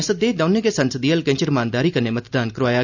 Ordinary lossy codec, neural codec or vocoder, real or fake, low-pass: none; none; real; 7.2 kHz